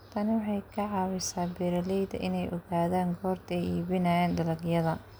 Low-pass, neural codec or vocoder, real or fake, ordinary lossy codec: none; none; real; none